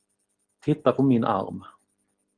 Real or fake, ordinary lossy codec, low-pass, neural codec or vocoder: real; Opus, 24 kbps; 9.9 kHz; none